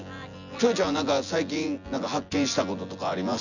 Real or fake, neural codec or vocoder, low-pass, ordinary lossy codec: fake; vocoder, 24 kHz, 100 mel bands, Vocos; 7.2 kHz; none